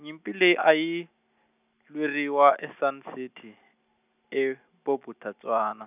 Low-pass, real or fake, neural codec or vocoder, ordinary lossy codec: 3.6 kHz; real; none; none